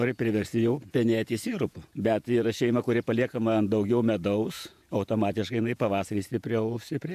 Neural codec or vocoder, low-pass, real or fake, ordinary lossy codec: vocoder, 48 kHz, 128 mel bands, Vocos; 14.4 kHz; fake; MP3, 96 kbps